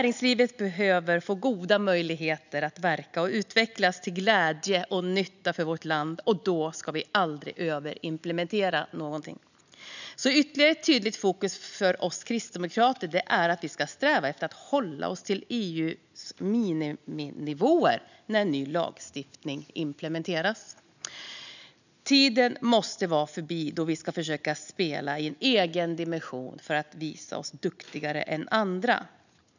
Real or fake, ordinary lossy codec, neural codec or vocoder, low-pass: real; none; none; 7.2 kHz